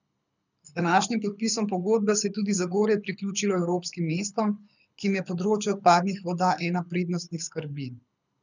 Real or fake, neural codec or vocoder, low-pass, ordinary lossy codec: fake; codec, 24 kHz, 6 kbps, HILCodec; 7.2 kHz; none